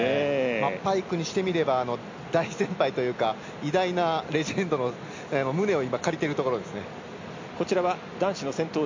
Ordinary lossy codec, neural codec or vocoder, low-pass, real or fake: MP3, 48 kbps; none; 7.2 kHz; real